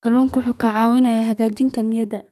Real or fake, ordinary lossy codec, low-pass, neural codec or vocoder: fake; none; 14.4 kHz; codec, 32 kHz, 1.9 kbps, SNAC